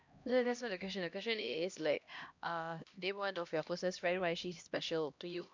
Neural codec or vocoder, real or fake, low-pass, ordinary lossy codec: codec, 16 kHz, 1 kbps, X-Codec, HuBERT features, trained on LibriSpeech; fake; 7.2 kHz; MP3, 64 kbps